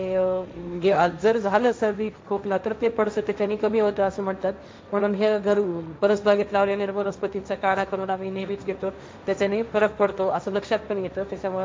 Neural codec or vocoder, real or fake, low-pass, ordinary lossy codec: codec, 16 kHz, 1.1 kbps, Voila-Tokenizer; fake; none; none